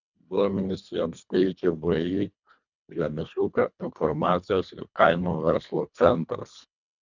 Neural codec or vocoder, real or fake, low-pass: codec, 24 kHz, 1.5 kbps, HILCodec; fake; 7.2 kHz